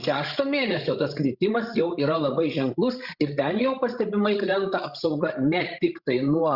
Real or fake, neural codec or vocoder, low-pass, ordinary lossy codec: fake; codec, 16 kHz, 16 kbps, FreqCodec, larger model; 5.4 kHz; Opus, 64 kbps